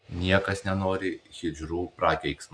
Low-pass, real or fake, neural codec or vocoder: 9.9 kHz; real; none